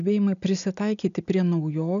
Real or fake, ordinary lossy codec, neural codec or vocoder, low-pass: real; MP3, 96 kbps; none; 7.2 kHz